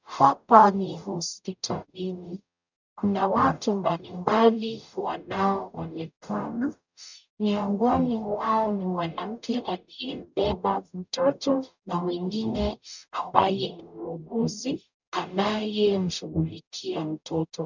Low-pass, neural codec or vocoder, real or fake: 7.2 kHz; codec, 44.1 kHz, 0.9 kbps, DAC; fake